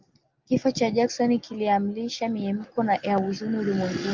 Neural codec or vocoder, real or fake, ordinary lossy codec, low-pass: none; real; Opus, 32 kbps; 7.2 kHz